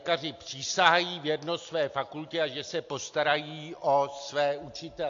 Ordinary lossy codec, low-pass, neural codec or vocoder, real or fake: AAC, 48 kbps; 7.2 kHz; none; real